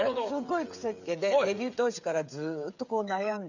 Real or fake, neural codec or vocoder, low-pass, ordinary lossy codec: fake; codec, 16 kHz, 8 kbps, FreqCodec, smaller model; 7.2 kHz; none